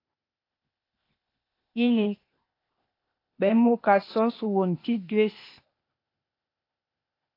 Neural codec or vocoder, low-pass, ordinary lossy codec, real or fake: codec, 16 kHz, 0.8 kbps, ZipCodec; 5.4 kHz; AAC, 32 kbps; fake